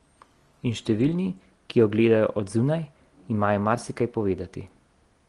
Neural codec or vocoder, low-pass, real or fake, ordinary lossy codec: none; 10.8 kHz; real; Opus, 24 kbps